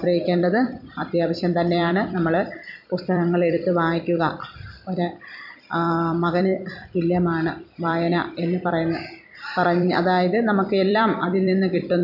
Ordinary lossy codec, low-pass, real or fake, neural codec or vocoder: none; 5.4 kHz; fake; vocoder, 44.1 kHz, 128 mel bands every 256 samples, BigVGAN v2